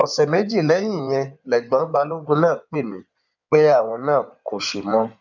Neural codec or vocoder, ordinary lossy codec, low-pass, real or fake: codec, 16 kHz in and 24 kHz out, 2.2 kbps, FireRedTTS-2 codec; none; 7.2 kHz; fake